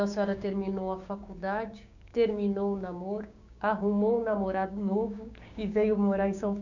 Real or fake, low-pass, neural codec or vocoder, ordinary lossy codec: fake; 7.2 kHz; codec, 16 kHz, 6 kbps, DAC; none